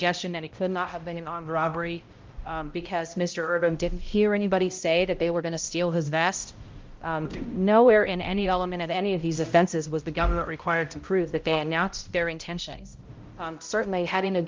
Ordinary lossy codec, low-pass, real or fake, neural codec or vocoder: Opus, 24 kbps; 7.2 kHz; fake; codec, 16 kHz, 0.5 kbps, X-Codec, HuBERT features, trained on balanced general audio